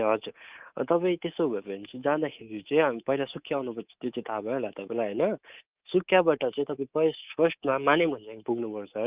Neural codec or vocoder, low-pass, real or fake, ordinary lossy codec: codec, 24 kHz, 3.1 kbps, DualCodec; 3.6 kHz; fake; Opus, 24 kbps